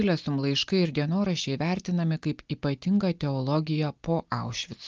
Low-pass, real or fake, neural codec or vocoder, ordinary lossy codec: 7.2 kHz; real; none; Opus, 24 kbps